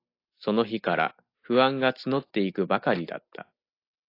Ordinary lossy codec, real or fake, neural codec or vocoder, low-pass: AAC, 32 kbps; real; none; 5.4 kHz